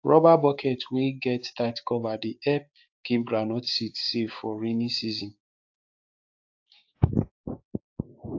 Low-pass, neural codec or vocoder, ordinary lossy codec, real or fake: 7.2 kHz; codec, 16 kHz, 6 kbps, DAC; AAC, 48 kbps; fake